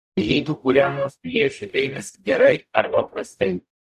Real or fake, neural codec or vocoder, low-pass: fake; codec, 44.1 kHz, 0.9 kbps, DAC; 14.4 kHz